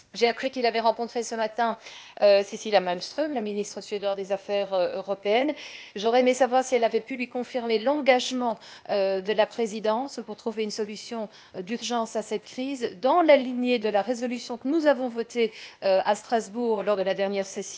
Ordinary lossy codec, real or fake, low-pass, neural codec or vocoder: none; fake; none; codec, 16 kHz, 0.8 kbps, ZipCodec